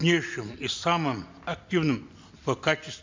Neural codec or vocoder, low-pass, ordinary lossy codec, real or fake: none; 7.2 kHz; MP3, 64 kbps; real